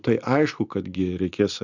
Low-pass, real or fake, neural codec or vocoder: 7.2 kHz; real; none